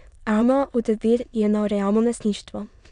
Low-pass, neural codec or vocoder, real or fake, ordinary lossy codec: 9.9 kHz; autoencoder, 22.05 kHz, a latent of 192 numbers a frame, VITS, trained on many speakers; fake; none